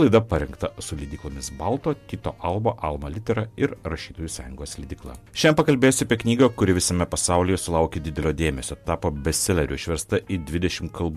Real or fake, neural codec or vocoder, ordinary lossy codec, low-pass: real; none; MP3, 96 kbps; 14.4 kHz